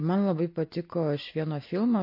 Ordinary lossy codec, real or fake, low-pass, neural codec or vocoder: MP3, 32 kbps; real; 5.4 kHz; none